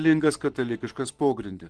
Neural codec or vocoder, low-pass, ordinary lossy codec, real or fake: vocoder, 44.1 kHz, 128 mel bands every 512 samples, BigVGAN v2; 10.8 kHz; Opus, 16 kbps; fake